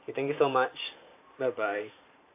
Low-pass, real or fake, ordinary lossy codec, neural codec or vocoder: 3.6 kHz; fake; AAC, 24 kbps; vocoder, 44.1 kHz, 128 mel bands every 512 samples, BigVGAN v2